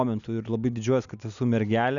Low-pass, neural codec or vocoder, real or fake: 7.2 kHz; none; real